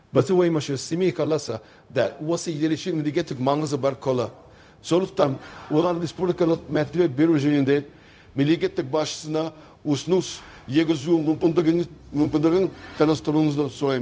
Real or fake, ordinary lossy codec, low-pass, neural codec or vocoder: fake; none; none; codec, 16 kHz, 0.4 kbps, LongCat-Audio-Codec